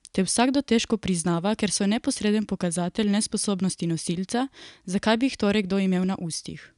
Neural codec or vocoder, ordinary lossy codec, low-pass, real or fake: none; none; 10.8 kHz; real